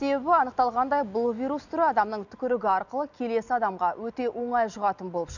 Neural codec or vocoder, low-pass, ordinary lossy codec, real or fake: none; 7.2 kHz; none; real